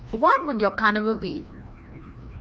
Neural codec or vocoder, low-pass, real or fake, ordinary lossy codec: codec, 16 kHz, 1 kbps, FreqCodec, larger model; none; fake; none